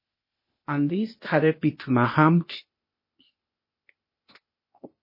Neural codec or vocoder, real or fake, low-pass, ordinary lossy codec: codec, 16 kHz, 0.8 kbps, ZipCodec; fake; 5.4 kHz; MP3, 24 kbps